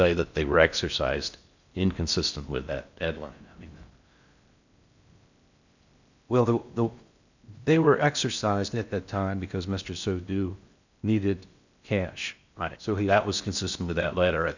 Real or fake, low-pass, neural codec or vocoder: fake; 7.2 kHz; codec, 16 kHz in and 24 kHz out, 0.6 kbps, FocalCodec, streaming, 2048 codes